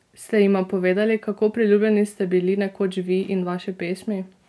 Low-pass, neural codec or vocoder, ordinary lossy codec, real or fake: none; none; none; real